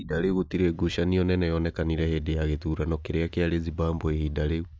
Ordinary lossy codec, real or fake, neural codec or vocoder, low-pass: none; real; none; none